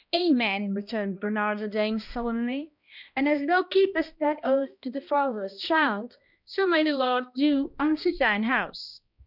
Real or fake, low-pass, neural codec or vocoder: fake; 5.4 kHz; codec, 16 kHz, 1 kbps, X-Codec, HuBERT features, trained on balanced general audio